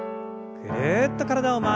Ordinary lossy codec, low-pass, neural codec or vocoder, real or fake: none; none; none; real